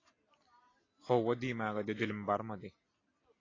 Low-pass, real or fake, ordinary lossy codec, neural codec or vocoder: 7.2 kHz; real; AAC, 32 kbps; none